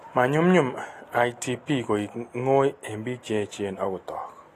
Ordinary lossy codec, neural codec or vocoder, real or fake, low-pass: AAC, 48 kbps; none; real; 14.4 kHz